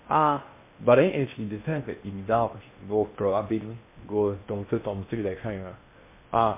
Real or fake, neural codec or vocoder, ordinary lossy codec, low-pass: fake; codec, 16 kHz in and 24 kHz out, 0.6 kbps, FocalCodec, streaming, 2048 codes; MP3, 24 kbps; 3.6 kHz